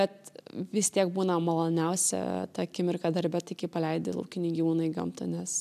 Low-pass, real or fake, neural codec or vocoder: 14.4 kHz; real; none